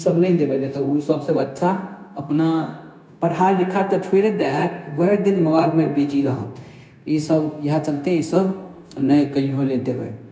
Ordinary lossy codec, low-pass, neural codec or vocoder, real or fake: none; none; codec, 16 kHz, 0.9 kbps, LongCat-Audio-Codec; fake